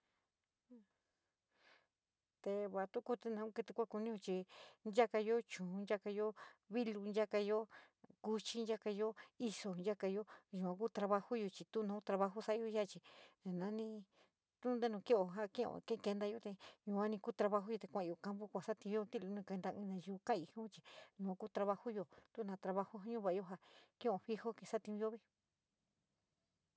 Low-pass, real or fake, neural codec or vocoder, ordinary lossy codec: none; real; none; none